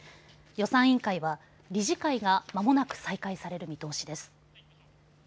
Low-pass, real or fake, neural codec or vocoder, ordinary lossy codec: none; real; none; none